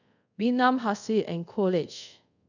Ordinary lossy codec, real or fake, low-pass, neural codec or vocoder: none; fake; 7.2 kHz; codec, 24 kHz, 0.5 kbps, DualCodec